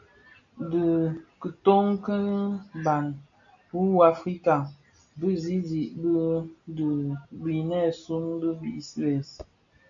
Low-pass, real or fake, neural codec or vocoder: 7.2 kHz; real; none